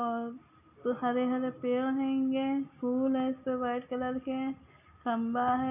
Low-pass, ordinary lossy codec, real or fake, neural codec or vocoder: 3.6 kHz; none; real; none